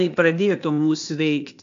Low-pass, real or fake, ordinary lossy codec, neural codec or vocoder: 7.2 kHz; fake; AAC, 64 kbps; codec, 16 kHz, 0.8 kbps, ZipCodec